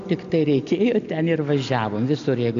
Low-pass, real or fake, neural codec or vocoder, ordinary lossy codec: 7.2 kHz; real; none; AAC, 64 kbps